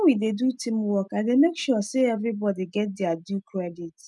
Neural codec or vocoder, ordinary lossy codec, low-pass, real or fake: none; none; none; real